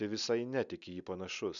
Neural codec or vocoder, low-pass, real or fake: none; 7.2 kHz; real